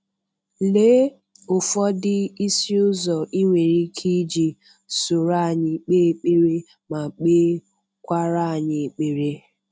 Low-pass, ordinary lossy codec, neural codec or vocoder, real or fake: none; none; none; real